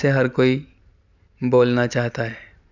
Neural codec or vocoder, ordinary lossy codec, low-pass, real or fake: none; none; 7.2 kHz; real